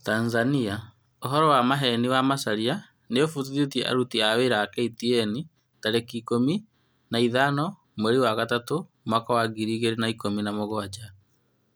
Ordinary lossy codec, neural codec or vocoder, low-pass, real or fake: none; none; none; real